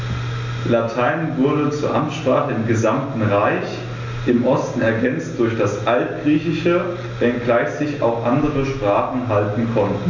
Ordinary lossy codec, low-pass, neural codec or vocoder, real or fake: MP3, 64 kbps; 7.2 kHz; none; real